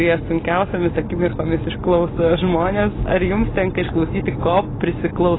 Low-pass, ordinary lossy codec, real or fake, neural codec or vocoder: 7.2 kHz; AAC, 16 kbps; real; none